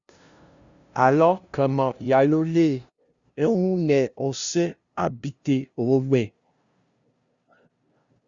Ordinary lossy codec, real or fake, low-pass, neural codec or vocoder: Opus, 64 kbps; fake; 7.2 kHz; codec, 16 kHz, 0.5 kbps, FunCodec, trained on LibriTTS, 25 frames a second